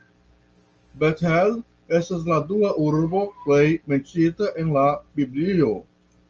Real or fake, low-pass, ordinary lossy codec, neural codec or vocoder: real; 7.2 kHz; Opus, 24 kbps; none